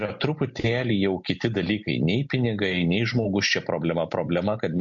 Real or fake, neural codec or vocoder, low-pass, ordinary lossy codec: real; none; 7.2 kHz; MP3, 48 kbps